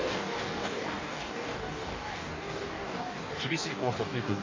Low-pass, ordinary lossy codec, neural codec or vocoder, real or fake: 7.2 kHz; MP3, 64 kbps; codec, 44.1 kHz, 2.6 kbps, DAC; fake